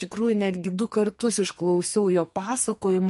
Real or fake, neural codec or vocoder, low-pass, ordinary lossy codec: fake; codec, 44.1 kHz, 2.6 kbps, SNAC; 14.4 kHz; MP3, 48 kbps